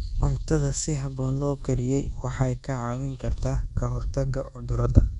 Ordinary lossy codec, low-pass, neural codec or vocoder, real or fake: none; 10.8 kHz; codec, 24 kHz, 1.2 kbps, DualCodec; fake